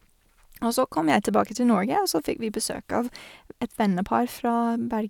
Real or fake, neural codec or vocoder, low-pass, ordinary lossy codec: real; none; 19.8 kHz; none